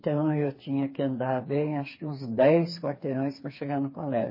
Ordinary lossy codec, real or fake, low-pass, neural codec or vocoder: MP3, 24 kbps; fake; 5.4 kHz; codec, 16 kHz, 4 kbps, FreqCodec, smaller model